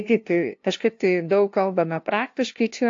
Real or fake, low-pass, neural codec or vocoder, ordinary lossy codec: fake; 7.2 kHz; codec, 16 kHz, 0.5 kbps, FunCodec, trained on LibriTTS, 25 frames a second; AAC, 48 kbps